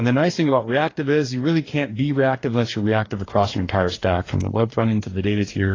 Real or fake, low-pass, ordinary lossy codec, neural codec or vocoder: fake; 7.2 kHz; AAC, 32 kbps; codec, 44.1 kHz, 2.6 kbps, DAC